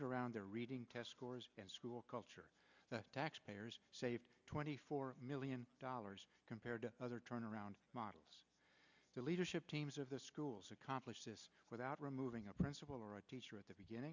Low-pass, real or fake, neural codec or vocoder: 7.2 kHz; real; none